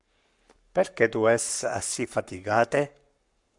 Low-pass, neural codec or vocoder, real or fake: 10.8 kHz; codec, 44.1 kHz, 7.8 kbps, Pupu-Codec; fake